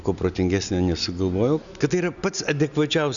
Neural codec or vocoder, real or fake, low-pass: none; real; 7.2 kHz